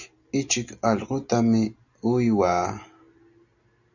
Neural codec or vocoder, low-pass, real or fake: none; 7.2 kHz; real